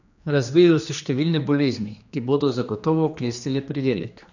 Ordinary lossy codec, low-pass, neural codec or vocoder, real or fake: none; 7.2 kHz; codec, 16 kHz, 2 kbps, X-Codec, HuBERT features, trained on general audio; fake